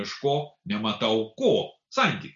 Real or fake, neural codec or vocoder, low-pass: real; none; 7.2 kHz